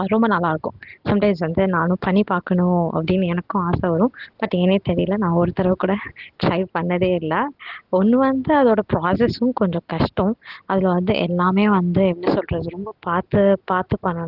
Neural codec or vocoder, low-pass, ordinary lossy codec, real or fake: none; 5.4 kHz; Opus, 16 kbps; real